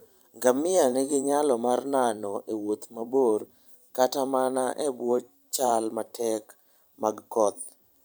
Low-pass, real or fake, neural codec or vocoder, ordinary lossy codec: none; fake; vocoder, 44.1 kHz, 128 mel bands every 512 samples, BigVGAN v2; none